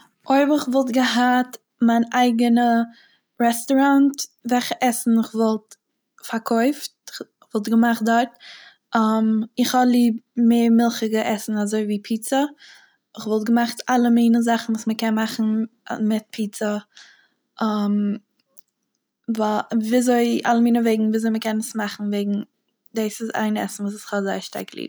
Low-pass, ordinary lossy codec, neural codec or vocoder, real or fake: none; none; none; real